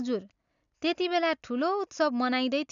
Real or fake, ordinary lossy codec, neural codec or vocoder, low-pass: real; none; none; 7.2 kHz